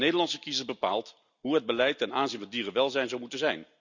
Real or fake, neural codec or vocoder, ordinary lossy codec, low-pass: real; none; none; 7.2 kHz